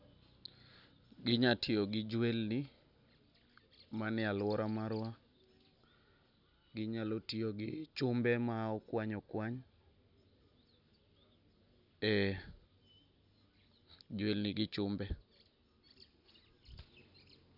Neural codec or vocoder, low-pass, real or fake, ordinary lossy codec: none; 5.4 kHz; real; none